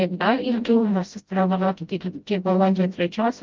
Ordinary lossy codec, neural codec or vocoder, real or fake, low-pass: Opus, 24 kbps; codec, 16 kHz, 0.5 kbps, FreqCodec, smaller model; fake; 7.2 kHz